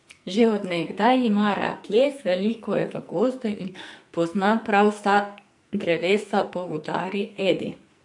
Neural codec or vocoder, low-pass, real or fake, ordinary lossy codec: codec, 44.1 kHz, 2.6 kbps, SNAC; 10.8 kHz; fake; MP3, 64 kbps